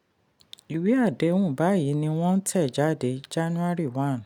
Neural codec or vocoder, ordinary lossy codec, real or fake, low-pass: none; none; real; 19.8 kHz